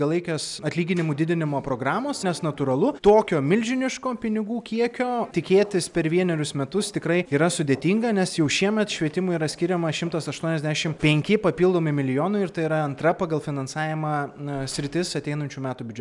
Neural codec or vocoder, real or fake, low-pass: none; real; 10.8 kHz